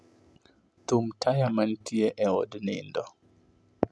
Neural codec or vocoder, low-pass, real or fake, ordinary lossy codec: none; none; real; none